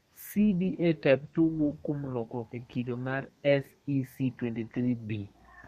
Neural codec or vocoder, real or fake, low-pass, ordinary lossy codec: codec, 32 kHz, 1.9 kbps, SNAC; fake; 14.4 kHz; MP3, 64 kbps